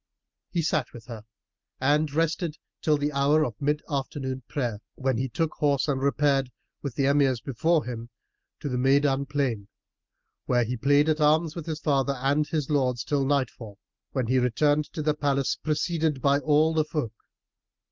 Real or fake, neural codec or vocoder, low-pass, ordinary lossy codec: real; none; 7.2 kHz; Opus, 32 kbps